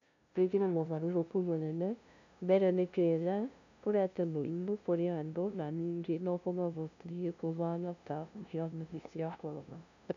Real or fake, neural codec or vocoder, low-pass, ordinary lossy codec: fake; codec, 16 kHz, 0.5 kbps, FunCodec, trained on LibriTTS, 25 frames a second; 7.2 kHz; none